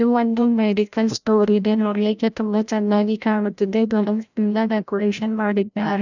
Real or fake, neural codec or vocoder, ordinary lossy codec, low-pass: fake; codec, 16 kHz, 0.5 kbps, FreqCodec, larger model; none; 7.2 kHz